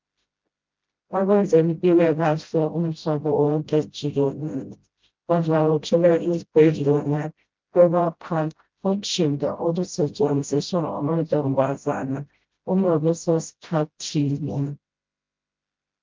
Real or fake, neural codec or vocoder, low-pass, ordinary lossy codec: fake; codec, 16 kHz, 0.5 kbps, FreqCodec, smaller model; 7.2 kHz; Opus, 24 kbps